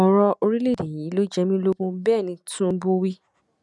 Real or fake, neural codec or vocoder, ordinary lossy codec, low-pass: real; none; none; none